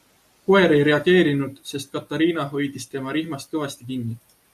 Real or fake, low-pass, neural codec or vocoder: real; 14.4 kHz; none